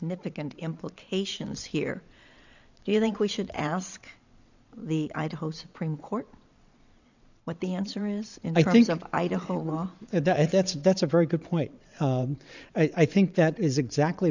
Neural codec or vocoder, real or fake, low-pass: vocoder, 22.05 kHz, 80 mel bands, WaveNeXt; fake; 7.2 kHz